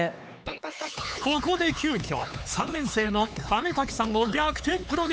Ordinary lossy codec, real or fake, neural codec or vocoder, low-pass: none; fake; codec, 16 kHz, 4 kbps, X-Codec, HuBERT features, trained on LibriSpeech; none